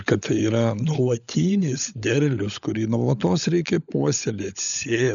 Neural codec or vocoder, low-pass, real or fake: codec, 16 kHz, 16 kbps, FunCodec, trained on LibriTTS, 50 frames a second; 7.2 kHz; fake